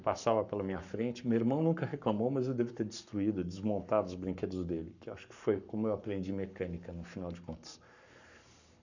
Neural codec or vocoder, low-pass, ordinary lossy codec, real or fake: codec, 44.1 kHz, 7.8 kbps, Pupu-Codec; 7.2 kHz; none; fake